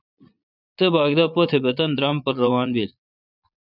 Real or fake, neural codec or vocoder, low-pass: fake; vocoder, 22.05 kHz, 80 mel bands, Vocos; 5.4 kHz